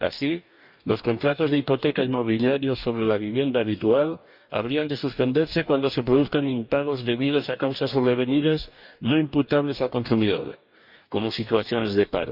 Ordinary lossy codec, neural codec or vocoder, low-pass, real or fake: none; codec, 44.1 kHz, 2.6 kbps, DAC; 5.4 kHz; fake